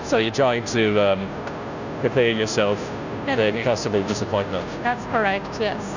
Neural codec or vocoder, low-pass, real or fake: codec, 16 kHz, 0.5 kbps, FunCodec, trained on Chinese and English, 25 frames a second; 7.2 kHz; fake